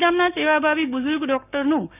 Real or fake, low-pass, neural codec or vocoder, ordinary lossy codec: fake; 3.6 kHz; codec, 16 kHz, 6 kbps, DAC; none